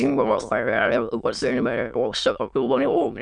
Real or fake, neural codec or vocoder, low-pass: fake; autoencoder, 22.05 kHz, a latent of 192 numbers a frame, VITS, trained on many speakers; 9.9 kHz